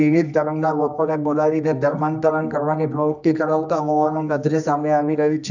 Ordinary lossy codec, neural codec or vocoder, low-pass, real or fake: none; codec, 24 kHz, 0.9 kbps, WavTokenizer, medium music audio release; 7.2 kHz; fake